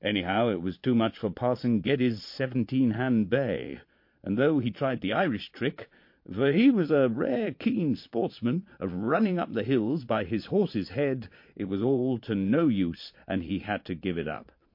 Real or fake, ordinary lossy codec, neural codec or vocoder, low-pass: fake; MP3, 32 kbps; vocoder, 22.05 kHz, 80 mel bands, Vocos; 5.4 kHz